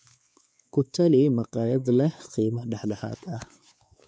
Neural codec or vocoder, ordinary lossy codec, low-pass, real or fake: codec, 16 kHz, 4 kbps, X-Codec, WavLM features, trained on Multilingual LibriSpeech; none; none; fake